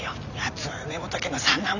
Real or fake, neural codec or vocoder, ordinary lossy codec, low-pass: real; none; none; 7.2 kHz